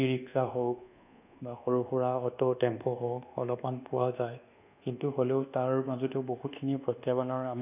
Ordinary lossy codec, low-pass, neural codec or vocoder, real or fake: none; 3.6 kHz; codec, 24 kHz, 1.2 kbps, DualCodec; fake